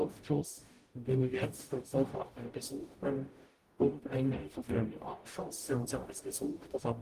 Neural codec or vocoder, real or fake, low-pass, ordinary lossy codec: codec, 44.1 kHz, 0.9 kbps, DAC; fake; 14.4 kHz; Opus, 24 kbps